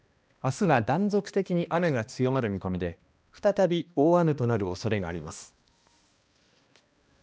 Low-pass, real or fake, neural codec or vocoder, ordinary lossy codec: none; fake; codec, 16 kHz, 1 kbps, X-Codec, HuBERT features, trained on balanced general audio; none